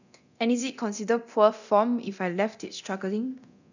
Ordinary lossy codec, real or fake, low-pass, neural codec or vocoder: none; fake; 7.2 kHz; codec, 24 kHz, 0.9 kbps, DualCodec